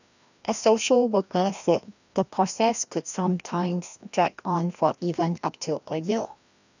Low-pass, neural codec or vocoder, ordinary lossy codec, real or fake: 7.2 kHz; codec, 16 kHz, 1 kbps, FreqCodec, larger model; none; fake